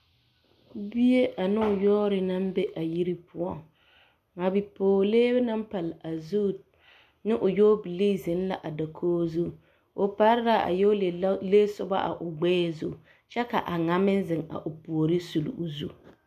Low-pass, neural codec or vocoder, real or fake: 14.4 kHz; none; real